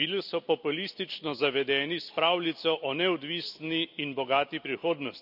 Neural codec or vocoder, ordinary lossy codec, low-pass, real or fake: none; none; 5.4 kHz; real